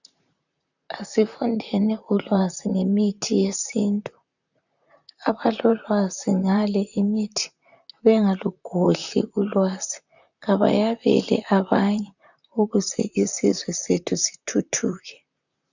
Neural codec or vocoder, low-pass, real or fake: vocoder, 44.1 kHz, 128 mel bands, Pupu-Vocoder; 7.2 kHz; fake